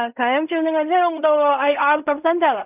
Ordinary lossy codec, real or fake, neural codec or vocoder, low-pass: none; fake; codec, 16 kHz in and 24 kHz out, 0.4 kbps, LongCat-Audio-Codec, fine tuned four codebook decoder; 3.6 kHz